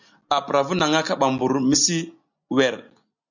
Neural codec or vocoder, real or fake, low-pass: none; real; 7.2 kHz